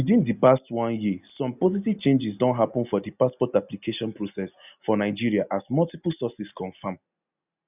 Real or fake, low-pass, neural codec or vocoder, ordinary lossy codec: real; 3.6 kHz; none; none